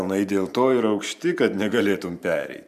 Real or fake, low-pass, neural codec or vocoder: fake; 14.4 kHz; vocoder, 44.1 kHz, 128 mel bands every 512 samples, BigVGAN v2